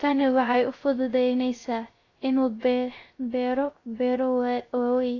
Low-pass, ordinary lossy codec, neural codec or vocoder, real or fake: 7.2 kHz; AAC, 32 kbps; codec, 16 kHz, 0.3 kbps, FocalCodec; fake